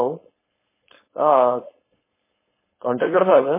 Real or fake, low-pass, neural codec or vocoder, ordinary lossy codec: fake; 3.6 kHz; codec, 16 kHz, 4.8 kbps, FACodec; MP3, 16 kbps